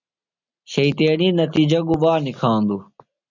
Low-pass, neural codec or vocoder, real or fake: 7.2 kHz; none; real